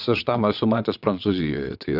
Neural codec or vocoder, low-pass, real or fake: vocoder, 22.05 kHz, 80 mel bands, WaveNeXt; 5.4 kHz; fake